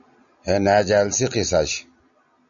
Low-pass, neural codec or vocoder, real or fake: 7.2 kHz; none; real